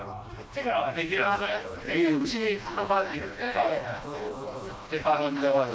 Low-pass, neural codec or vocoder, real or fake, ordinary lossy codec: none; codec, 16 kHz, 1 kbps, FreqCodec, smaller model; fake; none